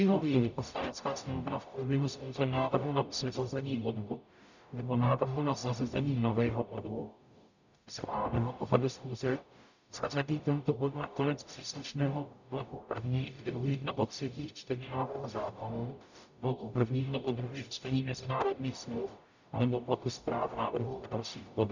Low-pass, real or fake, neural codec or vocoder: 7.2 kHz; fake; codec, 44.1 kHz, 0.9 kbps, DAC